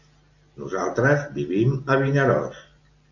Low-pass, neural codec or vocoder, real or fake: 7.2 kHz; none; real